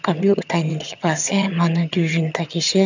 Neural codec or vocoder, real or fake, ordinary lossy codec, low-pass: vocoder, 22.05 kHz, 80 mel bands, HiFi-GAN; fake; none; 7.2 kHz